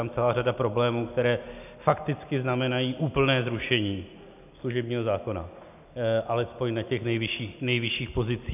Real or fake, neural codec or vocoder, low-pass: real; none; 3.6 kHz